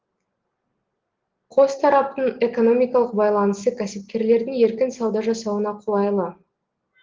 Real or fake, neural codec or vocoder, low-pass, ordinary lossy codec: real; none; 7.2 kHz; Opus, 16 kbps